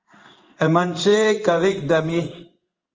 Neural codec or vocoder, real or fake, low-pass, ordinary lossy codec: codec, 16 kHz in and 24 kHz out, 1 kbps, XY-Tokenizer; fake; 7.2 kHz; Opus, 24 kbps